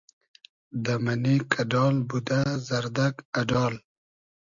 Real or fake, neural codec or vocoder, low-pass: real; none; 7.2 kHz